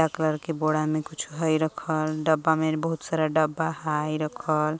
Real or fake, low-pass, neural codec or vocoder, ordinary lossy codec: real; none; none; none